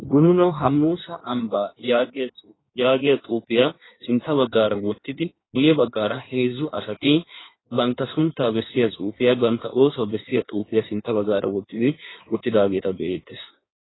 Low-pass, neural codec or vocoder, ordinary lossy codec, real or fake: 7.2 kHz; codec, 16 kHz in and 24 kHz out, 1.1 kbps, FireRedTTS-2 codec; AAC, 16 kbps; fake